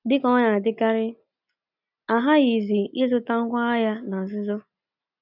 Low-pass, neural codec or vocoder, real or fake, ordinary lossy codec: 5.4 kHz; none; real; none